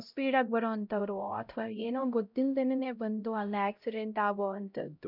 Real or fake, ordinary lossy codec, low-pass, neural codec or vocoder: fake; none; 5.4 kHz; codec, 16 kHz, 0.5 kbps, X-Codec, HuBERT features, trained on LibriSpeech